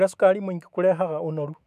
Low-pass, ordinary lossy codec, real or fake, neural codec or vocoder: 14.4 kHz; none; fake; autoencoder, 48 kHz, 128 numbers a frame, DAC-VAE, trained on Japanese speech